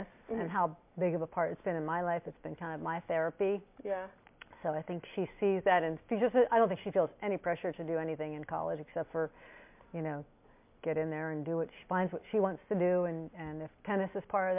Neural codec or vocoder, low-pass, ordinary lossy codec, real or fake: none; 3.6 kHz; MP3, 32 kbps; real